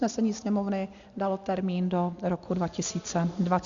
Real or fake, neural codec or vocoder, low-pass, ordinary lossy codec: real; none; 7.2 kHz; Opus, 64 kbps